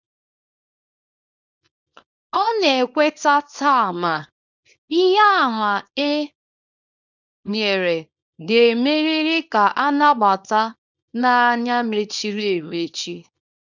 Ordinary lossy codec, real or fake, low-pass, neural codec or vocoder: none; fake; 7.2 kHz; codec, 24 kHz, 0.9 kbps, WavTokenizer, small release